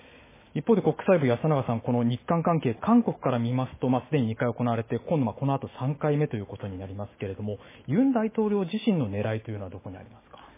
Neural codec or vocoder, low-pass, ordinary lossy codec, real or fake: autoencoder, 48 kHz, 128 numbers a frame, DAC-VAE, trained on Japanese speech; 3.6 kHz; MP3, 16 kbps; fake